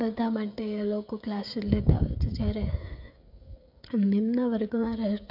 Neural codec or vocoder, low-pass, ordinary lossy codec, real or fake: codec, 16 kHz, 16 kbps, FreqCodec, smaller model; 5.4 kHz; AAC, 48 kbps; fake